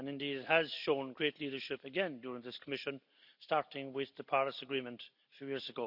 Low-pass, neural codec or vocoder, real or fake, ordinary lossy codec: 5.4 kHz; none; real; none